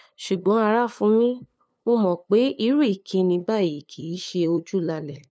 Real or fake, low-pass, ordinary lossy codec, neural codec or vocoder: fake; none; none; codec, 16 kHz, 2 kbps, FunCodec, trained on LibriTTS, 25 frames a second